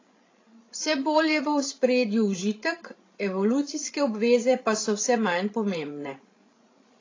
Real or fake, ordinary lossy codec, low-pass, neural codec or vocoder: fake; AAC, 32 kbps; 7.2 kHz; codec, 16 kHz, 16 kbps, FreqCodec, larger model